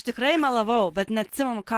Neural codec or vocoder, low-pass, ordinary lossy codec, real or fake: none; 14.4 kHz; Opus, 16 kbps; real